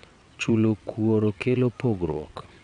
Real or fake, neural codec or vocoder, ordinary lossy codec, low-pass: fake; vocoder, 22.05 kHz, 80 mel bands, WaveNeXt; none; 9.9 kHz